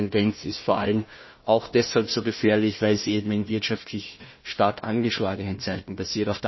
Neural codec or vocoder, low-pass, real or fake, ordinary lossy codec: codec, 16 kHz, 1 kbps, FunCodec, trained on Chinese and English, 50 frames a second; 7.2 kHz; fake; MP3, 24 kbps